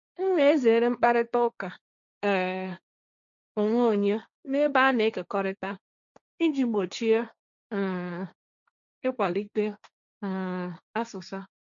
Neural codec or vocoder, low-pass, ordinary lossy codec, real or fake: codec, 16 kHz, 1.1 kbps, Voila-Tokenizer; 7.2 kHz; none; fake